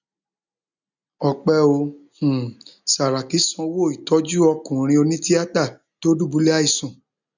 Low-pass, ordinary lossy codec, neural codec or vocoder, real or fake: 7.2 kHz; none; none; real